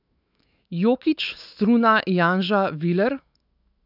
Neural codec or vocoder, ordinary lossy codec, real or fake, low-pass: autoencoder, 48 kHz, 128 numbers a frame, DAC-VAE, trained on Japanese speech; none; fake; 5.4 kHz